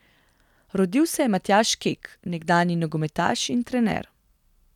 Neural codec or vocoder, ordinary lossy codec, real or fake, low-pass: none; none; real; 19.8 kHz